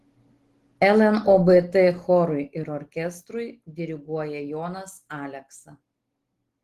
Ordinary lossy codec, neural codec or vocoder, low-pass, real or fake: Opus, 16 kbps; none; 14.4 kHz; real